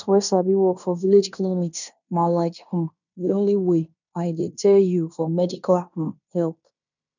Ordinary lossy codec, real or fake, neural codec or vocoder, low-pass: none; fake; codec, 16 kHz in and 24 kHz out, 0.9 kbps, LongCat-Audio-Codec, fine tuned four codebook decoder; 7.2 kHz